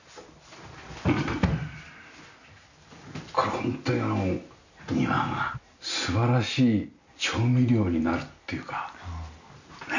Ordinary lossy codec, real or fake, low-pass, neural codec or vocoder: none; real; 7.2 kHz; none